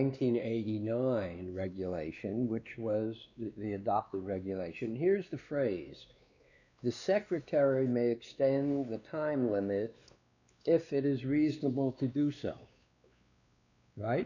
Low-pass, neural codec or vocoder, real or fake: 7.2 kHz; codec, 16 kHz, 2 kbps, X-Codec, WavLM features, trained on Multilingual LibriSpeech; fake